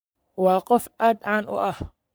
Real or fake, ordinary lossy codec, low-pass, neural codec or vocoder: fake; none; none; codec, 44.1 kHz, 3.4 kbps, Pupu-Codec